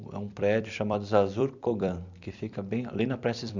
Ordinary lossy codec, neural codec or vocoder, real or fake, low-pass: none; vocoder, 44.1 kHz, 128 mel bands every 256 samples, BigVGAN v2; fake; 7.2 kHz